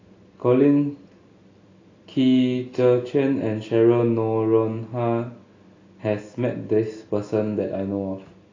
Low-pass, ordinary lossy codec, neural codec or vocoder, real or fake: 7.2 kHz; AAC, 32 kbps; none; real